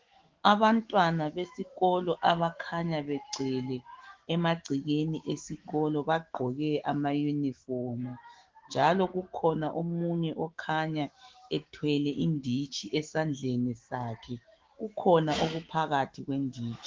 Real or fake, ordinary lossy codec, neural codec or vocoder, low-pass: fake; Opus, 16 kbps; autoencoder, 48 kHz, 128 numbers a frame, DAC-VAE, trained on Japanese speech; 7.2 kHz